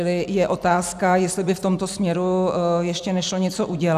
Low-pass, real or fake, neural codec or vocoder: 14.4 kHz; fake; vocoder, 44.1 kHz, 128 mel bands every 256 samples, BigVGAN v2